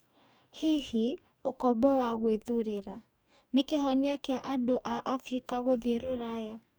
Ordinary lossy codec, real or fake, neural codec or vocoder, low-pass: none; fake; codec, 44.1 kHz, 2.6 kbps, DAC; none